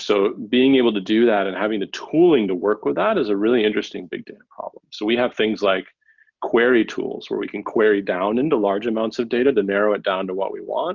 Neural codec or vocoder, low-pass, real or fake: none; 7.2 kHz; real